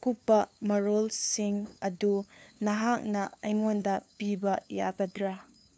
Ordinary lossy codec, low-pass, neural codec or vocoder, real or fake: none; none; codec, 16 kHz, 2 kbps, FunCodec, trained on LibriTTS, 25 frames a second; fake